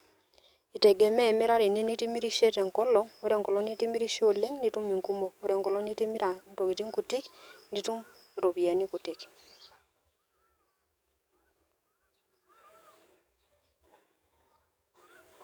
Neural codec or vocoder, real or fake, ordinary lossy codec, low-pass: codec, 44.1 kHz, 7.8 kbps, DAC; fake; none; none